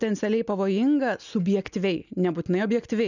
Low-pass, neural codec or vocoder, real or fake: 7.2 kHz; none; real